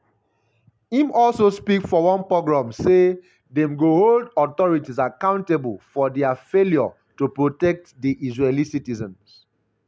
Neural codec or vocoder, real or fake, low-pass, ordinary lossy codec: none; real; none; none